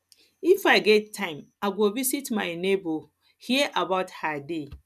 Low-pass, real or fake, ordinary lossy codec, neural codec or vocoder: 14.4 kHz; real; none; none